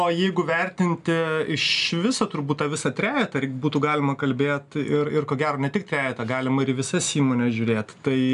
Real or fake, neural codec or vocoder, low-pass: real; none; 10.8 kHz